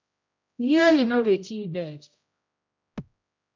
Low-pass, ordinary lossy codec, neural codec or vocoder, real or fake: 7.2 kHz; MP3, 64 kbps; codec, 16 kHz, 0.5 kbps, X-Codec, HuBERT features, trained on general audio; fake